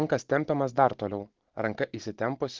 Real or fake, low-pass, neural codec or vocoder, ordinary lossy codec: fake; 7.2 kHz; vocoder, 44.1 kHz, 128 mel bands every 512 samples, BigVGAN v2; Opus, 16 kbps